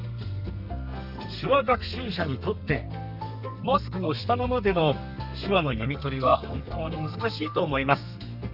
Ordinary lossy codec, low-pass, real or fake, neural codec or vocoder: none; 5.4 kHz; fake; codec, 32 kHz, 1.9 kbps, SNAC